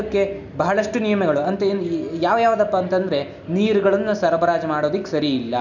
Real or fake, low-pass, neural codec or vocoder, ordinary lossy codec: real; 7.2 kHz; none; none